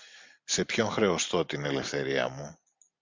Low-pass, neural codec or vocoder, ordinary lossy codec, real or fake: 7.2 kHz; none; MP3, 64 kbps; real